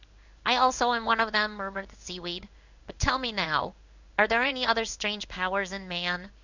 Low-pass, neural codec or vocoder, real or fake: 7.2 kHz; codec, 16 kHz in and 24 kHz out, 1 kbps, XY-Tokenizer; fake